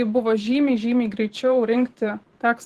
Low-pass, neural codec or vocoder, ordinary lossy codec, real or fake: 14.4 kHz; vocoder, 44.1 kHz, 128 mel bands every 512 samples, BigVGAN v2; Opus, 16 kbps; fake